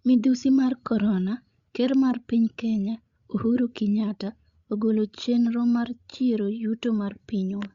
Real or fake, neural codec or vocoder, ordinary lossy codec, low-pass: fake; codec, 16 kHz, 16 kbps, FreqCodec, larger model; Opus, 64 kbps; 7.2 kHz